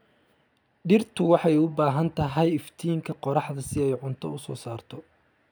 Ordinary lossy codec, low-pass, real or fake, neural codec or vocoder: none; none; real; none